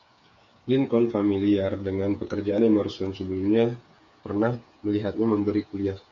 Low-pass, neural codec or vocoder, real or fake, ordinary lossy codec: 7.2 kHz; codec, 16 kHz, 8 kbps, FreqCodec, smaller model; fake; MP3, 64 kbps